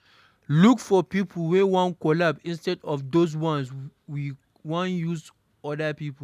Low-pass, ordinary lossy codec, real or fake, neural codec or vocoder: 14.4 kHz; none; real; none